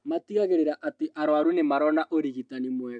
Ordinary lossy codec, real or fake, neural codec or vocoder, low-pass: MP3, 96 kbps; real; none; 9.9 kHz